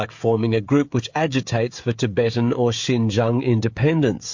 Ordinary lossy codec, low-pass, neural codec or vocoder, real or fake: MP3, 48 kbps; 7.2 kHz; codec, 16 kHz, 16 kbps, FreqCodec, smaller model; fake